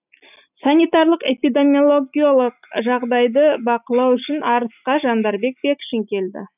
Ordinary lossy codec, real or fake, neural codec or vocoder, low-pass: none; real; none; 3.6 kHz